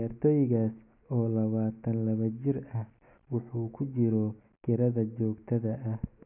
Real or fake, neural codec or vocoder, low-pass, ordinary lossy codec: real; none; 3.6 kHz; none